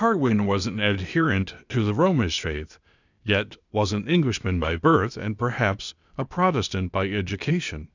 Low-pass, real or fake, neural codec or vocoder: 7.2 kHz; fake; codec, 16 kHz, 0.8 kbps, ZipCodec